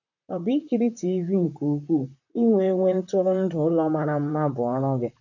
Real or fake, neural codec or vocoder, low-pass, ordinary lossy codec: fake; vocoder, 44.1 kHz, 128 mel bands, Pupu-Vocoder; 7.2 kHz; none